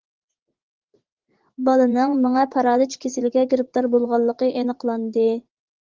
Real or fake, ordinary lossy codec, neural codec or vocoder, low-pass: fake; Opus, 16 kbps; vocoder, 44.1 kHz, 80 mel bands, Vocos; 7.2 kHz